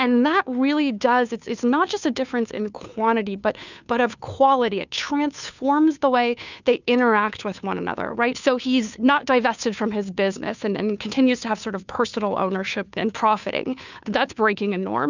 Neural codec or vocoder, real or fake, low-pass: codec, 16 kHz, 2 kbps, FunCodec, trained on Chinese and English, 25 frames a second; fake; 7.2 kHz